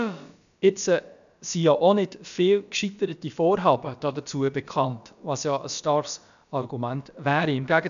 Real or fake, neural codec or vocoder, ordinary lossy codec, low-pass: fake; codec, 16 kHz, about 1 kbps, DyCAST, with the encoder's durations; none; 7.2 kHz